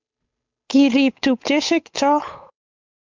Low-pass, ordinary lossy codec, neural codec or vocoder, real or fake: 7.2 kHz; MP3, 64 kbps; codec, 16 kHz, 8 kbps, FunCodec, trained on Chinese and English, 25 frames a second; fake